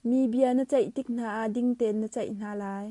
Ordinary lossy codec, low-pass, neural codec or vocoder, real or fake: MP3, 64 kbps; 10.8 kHz; none; real